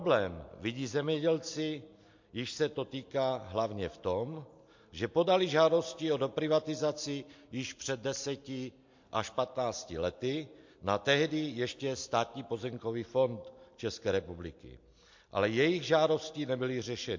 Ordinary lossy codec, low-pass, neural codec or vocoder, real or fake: MP3, 48 kbps; 7.2 kHz; none; real